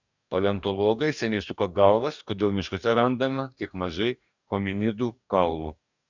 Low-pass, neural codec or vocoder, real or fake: 7.2 kHz; codec, 44.1 kHz, 2.6 kbps, DAC; fake